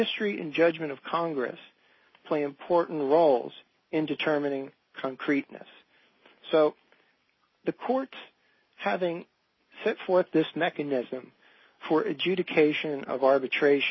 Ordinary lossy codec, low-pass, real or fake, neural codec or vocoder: MP3, 24 kbps; 7.2 kHz; real; none